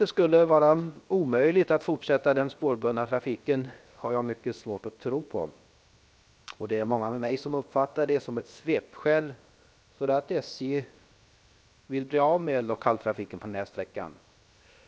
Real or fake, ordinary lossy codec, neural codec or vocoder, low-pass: fake; none; codec, 16 kHz, 0.7 kbps, FocalCodec; none